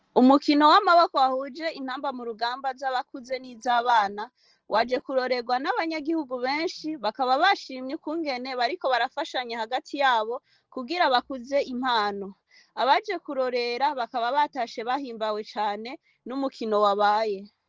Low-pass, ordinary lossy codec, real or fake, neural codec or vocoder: 7.2 kHz; Opus, 16 kbps; real; none